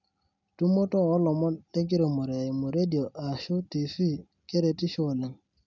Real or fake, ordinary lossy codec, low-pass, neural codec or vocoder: real; none; 7.2 kHz; none